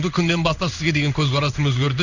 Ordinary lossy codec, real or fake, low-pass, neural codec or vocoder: none; fake; 7.2 kHz; codec, 16 kHz in and 24 kHz out, 1 kbps, XY-Tokenizer